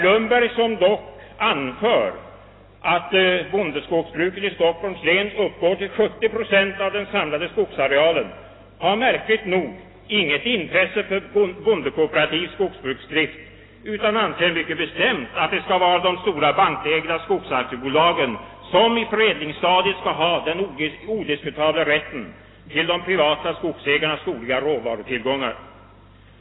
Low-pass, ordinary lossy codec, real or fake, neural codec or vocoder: 7.2 kHz; AAC, 16 kbps; real; none